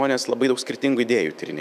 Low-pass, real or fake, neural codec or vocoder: 14.4 kHz; fake; autoencoder, 48 kHz, 128 numbers a frame, DAC-VAE, trained on Japanese speech